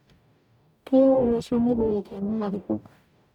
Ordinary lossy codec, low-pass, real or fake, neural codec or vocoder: none; 19.8 kHz; fake; codec, 44.1 kHz, 0.9 kbps, DAC